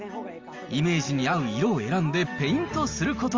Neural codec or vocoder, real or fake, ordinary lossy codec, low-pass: none; real; Opus, 32 kbps; 7.2 kHz